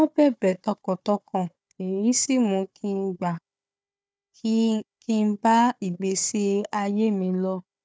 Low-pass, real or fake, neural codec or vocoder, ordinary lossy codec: none; fake; codec, 16 kHz, 4 kbps, FunCodec, trained on Chinese and English, 50 frames a second; none